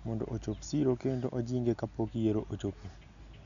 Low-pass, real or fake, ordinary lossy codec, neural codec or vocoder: 7.2 kHz; real; none; none